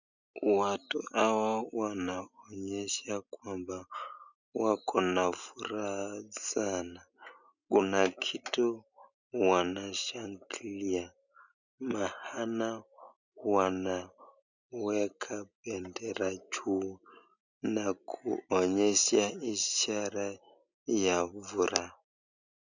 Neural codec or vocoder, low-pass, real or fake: none; 7.2 kHz; real